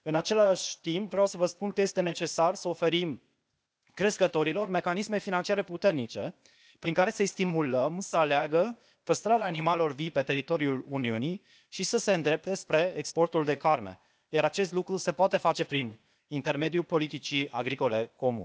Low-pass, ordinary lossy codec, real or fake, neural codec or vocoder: none; none; fake; codec, 16 kHz, 0.8 kbps, ZipCodec